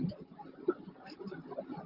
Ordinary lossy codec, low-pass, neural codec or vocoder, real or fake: Opus, 32 kbps; 5.4 kHz; vocoder, 44.1 kHz, 128 mel bands, Pupu-Vocoder; fake